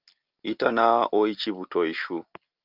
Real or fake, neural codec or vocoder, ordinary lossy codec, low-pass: real; none; Opus, 24 kbps; 5.4 kHz